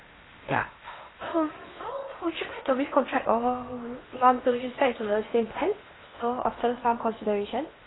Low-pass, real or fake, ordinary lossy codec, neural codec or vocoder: 7.2 kHz; fake; AAC, 16 kbps; codec, 16 kHz in and 24 kHz out, 0.8 kbps, FocalCodec, streaming, 65536 codes